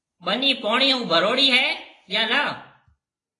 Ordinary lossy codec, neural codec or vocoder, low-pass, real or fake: AAC, 32 kbps; none; 10.8 kHz; real